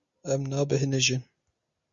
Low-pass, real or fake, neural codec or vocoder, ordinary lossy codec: 7.2 kHz; real; none; Opus, 64 kbps